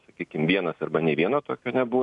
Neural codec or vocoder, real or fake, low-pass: none; real; 10.8 kHz